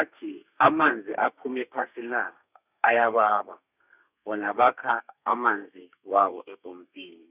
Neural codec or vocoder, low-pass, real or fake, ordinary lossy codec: codec, 32 kHz, 1.9 kbps, SNAC; 3.6 kHz; fake; none